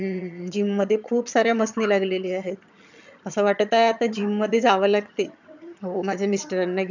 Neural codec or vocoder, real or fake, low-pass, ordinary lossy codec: vocoder, 22.05 kHz, 80 mel bands, HiFi-GAN; fake; 7.2 kHz; none